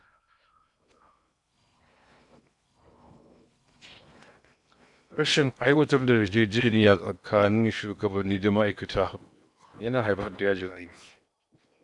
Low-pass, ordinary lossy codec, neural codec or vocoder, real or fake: 10.8 kHz; none; codec, 16 kHz in and 24 kHz out, 0.8 kbps, FocalCodec, streaming, 65536 codes; fake